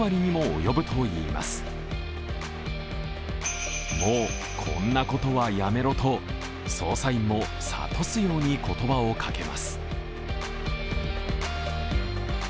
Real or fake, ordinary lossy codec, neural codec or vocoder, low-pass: real; none; none; none